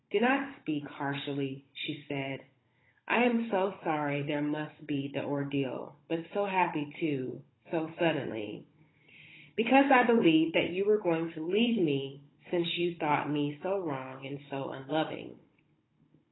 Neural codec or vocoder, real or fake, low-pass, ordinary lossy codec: codec, 16 kHz, 16 kbps, FunCodec, trained on Chinese and English, 50 frames a second; fake; 7.2 kHz; AAC, 16 kbps